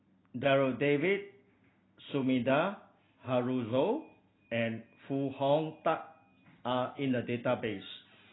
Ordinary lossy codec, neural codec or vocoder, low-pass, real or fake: AAC, 16 kbps; none; 7.2 kHz; real